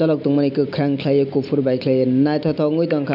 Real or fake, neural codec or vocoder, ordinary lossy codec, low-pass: real; none; none; 5.4 kHz